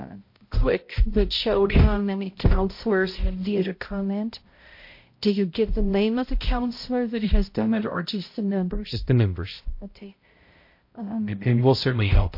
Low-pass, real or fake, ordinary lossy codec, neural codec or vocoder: 5.4 kHz; fake; MP3, 32 kbps; codec, 16 kHz, 0.5 kbps, X-Codec, HuBERT features, trained on balanced general audio